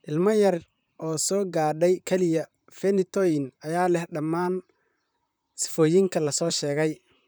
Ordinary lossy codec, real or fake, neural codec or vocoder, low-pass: none; fake; vocoder, 44.1 kHz, 128 mel bands, Pupu-Vocoder; none